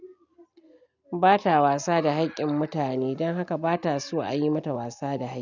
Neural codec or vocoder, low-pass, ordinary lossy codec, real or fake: none; 7.2 kHz; none; real